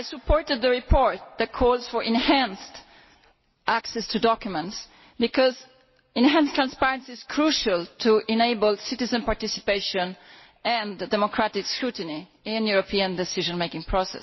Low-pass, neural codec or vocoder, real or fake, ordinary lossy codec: 7.2 kHz; none; real; MP3, 24 kbps